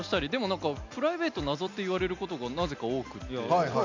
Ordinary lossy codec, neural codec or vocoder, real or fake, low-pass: MP3, 64 kbps; none; real; 7.2 kHz